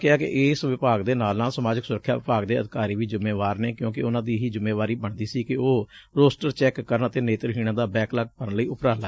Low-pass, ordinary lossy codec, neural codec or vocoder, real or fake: none; none; none; real